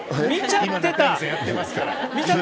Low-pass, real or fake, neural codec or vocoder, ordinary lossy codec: none; real; none; none